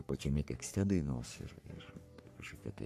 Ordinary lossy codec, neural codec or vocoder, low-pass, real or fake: MP3, 96 kbps; codec, 44.1 kHz, 3.4 kbps, Pupu-Codec; 14.4 kHz; fake